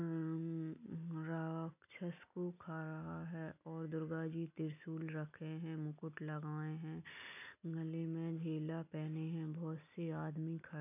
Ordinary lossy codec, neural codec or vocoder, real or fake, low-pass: none; none; real; 3.6 kHz